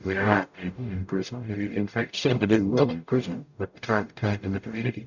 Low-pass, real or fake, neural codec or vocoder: 7.2 kHz; fake; codec, 44.1 kHz, 0.9 kbps, DAC